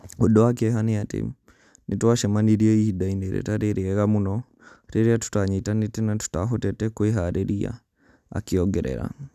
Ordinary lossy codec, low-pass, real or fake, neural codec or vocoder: none; 14.4 kHz; real; none